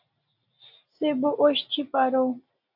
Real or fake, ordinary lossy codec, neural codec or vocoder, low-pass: real; AAC, 48 kbps; none; 5.4 kHz